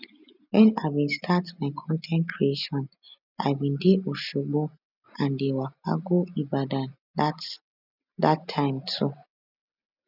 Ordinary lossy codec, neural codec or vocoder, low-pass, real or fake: none; none; 5.4 kHz; real